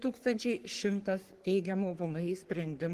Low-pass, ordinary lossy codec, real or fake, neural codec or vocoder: 14.4 kHz; Opus, 16 kbps; fake; codec, 44.1 kHz, 3.4 kbps, Pupu-Codec